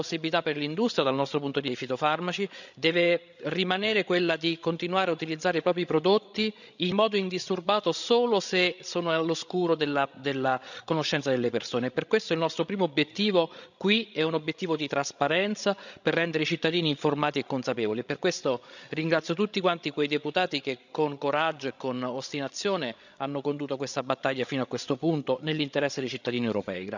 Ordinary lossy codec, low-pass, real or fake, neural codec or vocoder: none; 7.2 kHz; fake; codec, 16 kHz, 16 kbps, FreqCodec, larger model